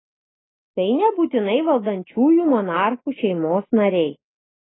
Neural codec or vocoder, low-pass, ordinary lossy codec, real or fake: none; 7.2 kHz; AAC, 16 kbps; real